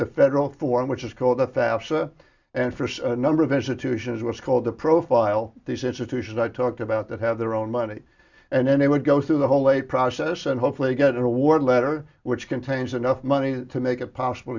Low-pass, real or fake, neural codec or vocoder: 7.2 kHz; real; none